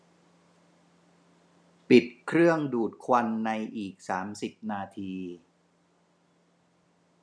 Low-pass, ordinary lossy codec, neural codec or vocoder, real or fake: none; none; none; real